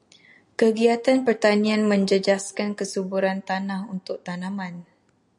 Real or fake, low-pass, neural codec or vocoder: real; 10.8 kHz; none